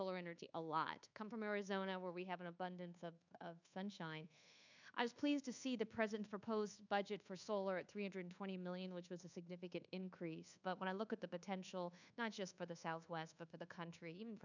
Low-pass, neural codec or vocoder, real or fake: 7.2 kHz; codec, 24 kHz, 1.2 kbps, DualCodec; fake